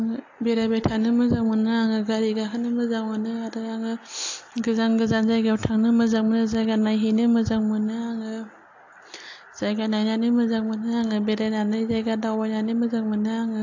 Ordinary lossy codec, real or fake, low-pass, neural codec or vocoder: AAC, 48 kbps; real; 7.2 kHz; none